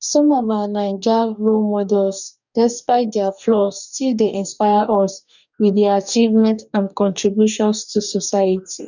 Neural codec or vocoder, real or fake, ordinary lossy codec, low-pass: codec, 44.1 kHz, 2.6 kbps, DAC; fake; none; 7.2 kHz